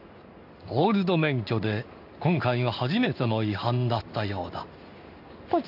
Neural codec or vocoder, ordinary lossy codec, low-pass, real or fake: codec, 16 kHz in and 24 kHz out, 1 kbps, XY-Tokenizer; AAC, 48 kbps; 5.4 kHz; fake